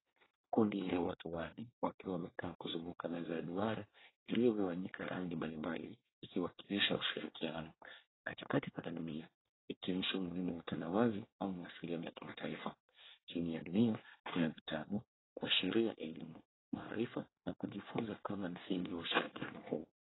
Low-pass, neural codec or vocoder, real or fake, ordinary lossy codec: 7.2 kHz; codec, 24 kHz, 1 kbps, SNAC; fake; AAC, 16 kbps